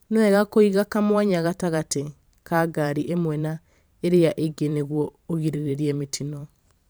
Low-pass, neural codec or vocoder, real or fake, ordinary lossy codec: none; vocoder, 44.1 kHz, 128 mel bands every 512 samples, BigVGAN v2; fake; none